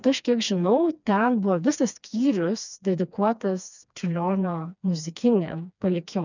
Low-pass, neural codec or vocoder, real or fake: 7.2 kHz; codec, 16 kHz, 2 kbps, FreqCodec, smaller model; fake